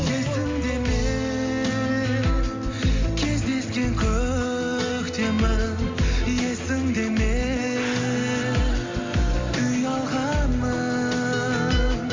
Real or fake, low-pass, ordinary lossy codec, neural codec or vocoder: real; 7.2 kHz; MP3, 48 kbps; none